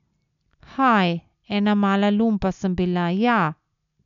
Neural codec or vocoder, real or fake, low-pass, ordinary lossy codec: none; real; 7.2 kHz; none